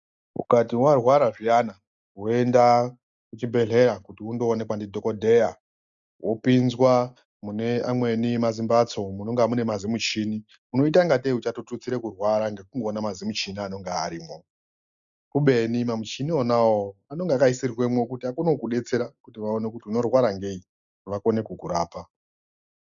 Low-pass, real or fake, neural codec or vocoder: 7.2 kHz; real; none